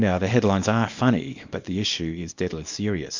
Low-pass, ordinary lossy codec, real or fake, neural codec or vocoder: 7.2 kHz; MP3, 48 kbps; fake; codec, 24 kHz, 0.9 kbps, WavTokenizer, small release